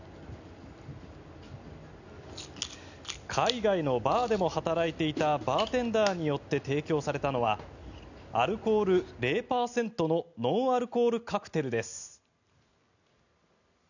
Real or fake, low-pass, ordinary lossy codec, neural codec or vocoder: real; 7.2 kHz; none; none